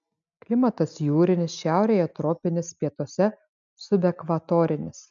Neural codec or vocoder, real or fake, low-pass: none; real; 7.2 kHz